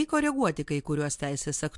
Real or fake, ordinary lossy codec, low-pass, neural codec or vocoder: real; MP3, 64 kbps; 10.8 kHz; none